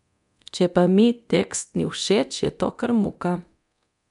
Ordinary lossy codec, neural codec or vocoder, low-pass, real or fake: none; codec, 24 kHz, 0.9 kbps, DualCodec; 10.8 kHz; fake